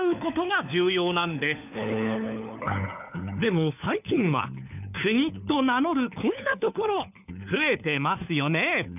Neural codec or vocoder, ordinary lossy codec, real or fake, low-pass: codec, 16 kHz, 4 kbps, FunCodec, trained on LibriTTS, 50 frames a second; none; fake; 3.6 kHz